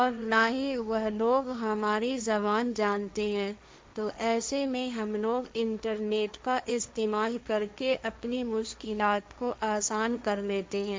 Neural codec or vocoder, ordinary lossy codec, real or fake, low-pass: codec, 16 kHz, 1.1 kbps, Voila-Tokenizer; none; fake; none